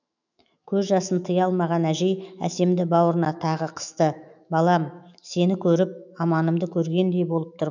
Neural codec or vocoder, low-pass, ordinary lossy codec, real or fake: autoencoder, 48 kHz, 128 numbers a frame, DAC-VAE, trained on Japanese speech; 7.2 kHz; none; fake